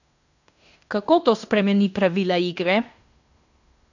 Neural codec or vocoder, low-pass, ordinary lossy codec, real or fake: codec, 16 kHz in and 24 kHz out, 0.9 kbps, LongCat-Audio-Codec, fine tuned four codebook decoder; 7.2 kHz; none; fake